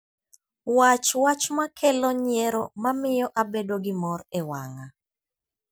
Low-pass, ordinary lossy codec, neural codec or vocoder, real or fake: none; none; none; real